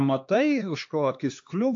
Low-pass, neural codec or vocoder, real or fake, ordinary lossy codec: 7.2 kHz; codec, 16 kHz, 2 kbps, X-Codec, HuBERT features, trained on LibriSpeech; fake; AAC, 48 kbps